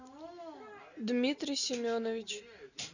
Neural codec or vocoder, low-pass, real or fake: none; 7.2 kHz; real